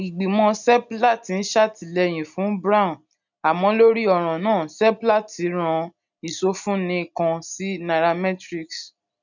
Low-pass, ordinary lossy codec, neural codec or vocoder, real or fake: 7.2 kHz; none; none; real